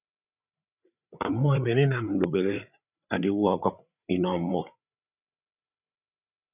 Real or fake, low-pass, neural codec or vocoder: fake; 3.6 kHz; codec, 16 kHz, 8 kbps, FreqCodec, larger model